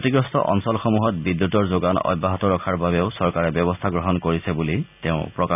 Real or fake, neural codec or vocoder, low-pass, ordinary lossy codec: real; none; 3.6 kHz; none